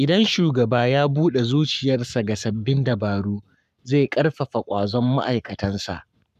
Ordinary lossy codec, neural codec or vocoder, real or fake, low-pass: none; codec, 44.1 kHz, 7.8 kbps, Pupu-Codec; fake; 14.4 kHz